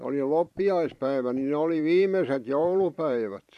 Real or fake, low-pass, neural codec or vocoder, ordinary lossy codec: fake; 14.4 kHz; vocoder, 44.1 kHz, 128 mel bands every 512 samples, BigVGAN v2; MP3, 64 kbps